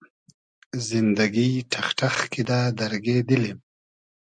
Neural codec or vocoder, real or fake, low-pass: none; real; 9.9 kHz